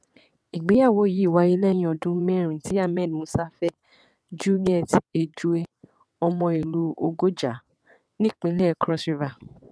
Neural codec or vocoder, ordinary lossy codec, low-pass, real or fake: vocoder, 22.05 kHz, 80 mel bands, WaveNeXt; none; none; fake